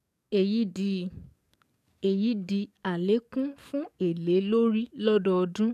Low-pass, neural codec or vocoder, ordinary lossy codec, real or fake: 14.4 kHz; codec, 44.1 kHz, 7.8 kbps, DAC; none; fake